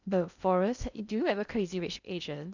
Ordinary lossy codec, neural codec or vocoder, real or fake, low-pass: none; codec, 16 kHz in and 24 kHz out, 0.6 kbps, FocalCodec, streaming, 2048 codes; fake; 7.2 kHz